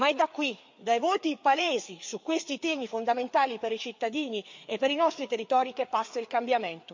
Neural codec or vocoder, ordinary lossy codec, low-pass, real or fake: codec, 16 kHz, 4 kbps, FreqCodec, larger model; MP3, 48 kbps; 7.2 kHz; fake